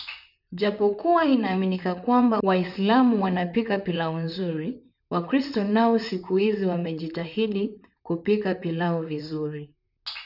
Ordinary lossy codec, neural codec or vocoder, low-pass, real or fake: none; vocoder, 44.1 kHz, 128 mel bands, Pupu-Vocoder; 5.4 kHz; fake